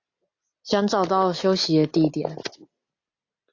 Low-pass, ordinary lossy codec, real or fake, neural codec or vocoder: 7.2 kHz; AAC, 48 kbps; real; none